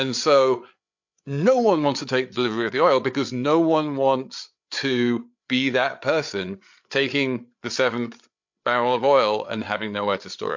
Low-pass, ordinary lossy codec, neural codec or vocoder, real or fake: 7.2 kHz; MP3, 48 kbps; codec, 16 kHz, 8 kbps, FreqCodec, larger model; fake